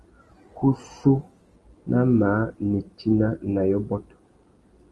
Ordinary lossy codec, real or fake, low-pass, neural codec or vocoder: Opus, 24 kbps; real; 10.8 kHz; none